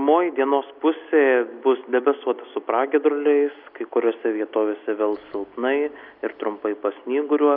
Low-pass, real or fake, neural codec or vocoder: 5.4 kHz; real; none